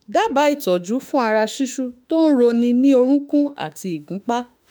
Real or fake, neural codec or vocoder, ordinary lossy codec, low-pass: fake; autoencoder, 48 kHz, 32 numbers a frame, DAC-VAE, trained on Japanese speech; none; none